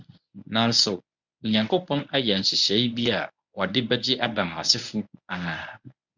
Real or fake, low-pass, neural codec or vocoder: fake; 7.2 kHz; codec, 24 kHz, 0.9 kbps, WavTokenizer, medium speech release version 1